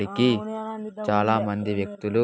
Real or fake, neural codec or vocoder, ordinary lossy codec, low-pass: real; none; none; none